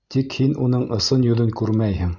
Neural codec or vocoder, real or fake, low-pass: none; real; 7.2 kHz